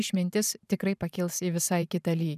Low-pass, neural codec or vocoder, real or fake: 14.4 kHz; vocoder, 44.1 kHz, 128 mel bands every 512 samples, BigVGAN v2; fake